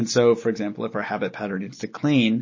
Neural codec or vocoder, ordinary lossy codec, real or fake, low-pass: none; MP3, 32 kbps; real; 7.2 kHz